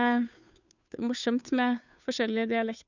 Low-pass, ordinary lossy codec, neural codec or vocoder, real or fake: 7.2 kHz; none; codec, 16 kHz, 2 kbps, FunCodec, trained on Chinese and English, 25 frames a second; fake